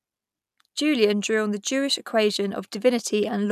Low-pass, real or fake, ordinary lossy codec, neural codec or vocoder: 14.4 kHz; real; none; none